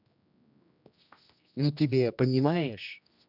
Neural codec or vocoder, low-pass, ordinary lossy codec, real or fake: codec, 16 kHz, 1 kbps, X-Codec, HuBERT features, trained on general audio; 5.4 kHz; none; fake